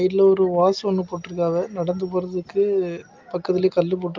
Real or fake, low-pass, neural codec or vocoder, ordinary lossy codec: real; 7.2 kHz; none; Opus, 24 kbps